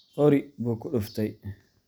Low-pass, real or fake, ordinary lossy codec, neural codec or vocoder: none; real; none; none